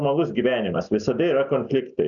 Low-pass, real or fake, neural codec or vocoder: 7.2 kHz; real; none